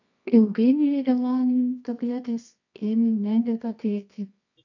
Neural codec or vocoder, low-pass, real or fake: codec, 24 kHz, 0.9 kbps, WavTokenizer, medium music audio release; 7.2 kHz; fake